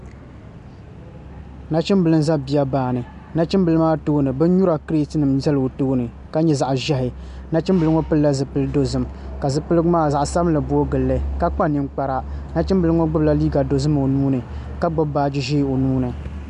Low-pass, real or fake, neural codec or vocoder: 10.8 kHz; real; none